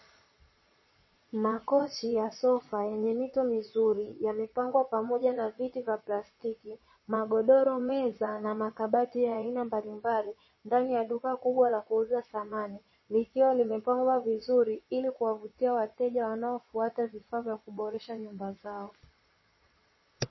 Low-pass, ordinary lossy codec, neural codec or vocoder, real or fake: 7.2 kHz; MP3, 24 kbps; vocoder, 22.05 kHz, 80 mel bands, Vocos; fake